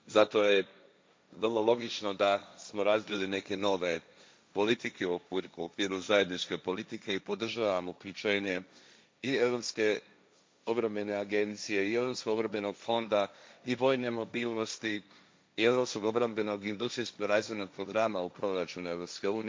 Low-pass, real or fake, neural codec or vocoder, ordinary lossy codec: none; fake; codec, 16 kHz, 1.1 kbps, Voila-Tokenizer; none